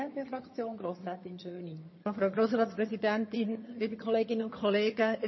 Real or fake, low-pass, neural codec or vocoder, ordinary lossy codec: fake; 7.2 kHz; vocoder, 22.05 kHz, 80 mel bands, HiFi-GAN; MP3, 24 kbps